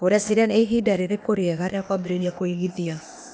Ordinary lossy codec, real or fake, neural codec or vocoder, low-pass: none; fake; codec, 16 kHz, 0.8 kbps, ZipCodec; none